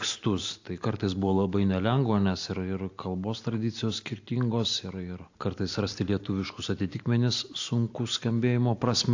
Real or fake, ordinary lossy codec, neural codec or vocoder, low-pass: real; AAC, 48 kbps; none; 7.2 kHz